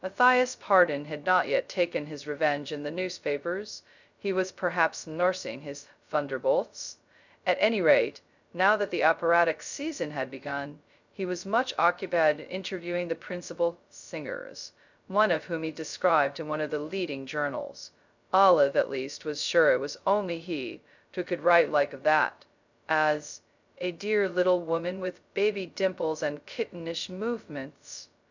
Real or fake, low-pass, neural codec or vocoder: fake; 7.2 kHz; codec, 16 kHz, 0.2 kbps, FocalCodec